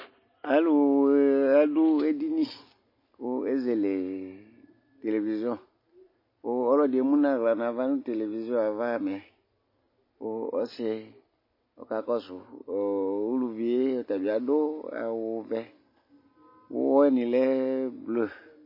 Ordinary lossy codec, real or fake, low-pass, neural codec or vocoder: MP3, 24 kbps; real; 5.4 kHz; none